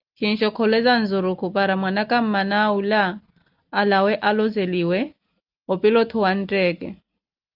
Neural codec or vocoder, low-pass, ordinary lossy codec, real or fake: none; 5.4 kHz; Opus, 24 kbps; real